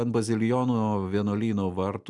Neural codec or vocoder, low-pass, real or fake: none; 10.8 kHz; real